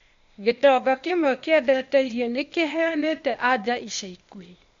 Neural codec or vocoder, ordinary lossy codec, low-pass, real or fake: codec, 16 kHz, 0.8 kbps, ZipCodec; MP3, 48 kbps; 7.2 kHz; fake